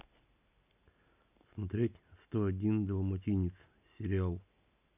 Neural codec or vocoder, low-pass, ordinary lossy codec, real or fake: none; 3.6 kHz; none; real